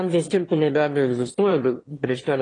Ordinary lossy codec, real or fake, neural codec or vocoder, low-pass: AAC, 32 kbps; fake; autoencoder, 22.05 kHz, a latent of 192 numbers a frame, VITS, trained on one speaker; 9.9 kHz